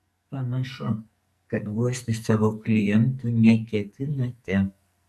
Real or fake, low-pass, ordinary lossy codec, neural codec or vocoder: fake; 14.4 kHz; AAC, 96 kbps; codec, 44.1 kHz, 2.6 kbps, SNAC